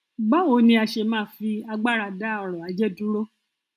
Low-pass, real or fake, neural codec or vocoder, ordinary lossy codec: 14.4 kHz; real; none; none